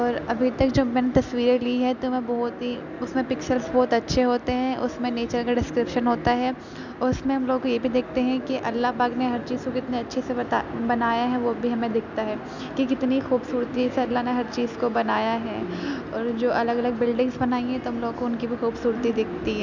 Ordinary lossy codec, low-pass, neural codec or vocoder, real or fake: none; 7.2 kHz; none; real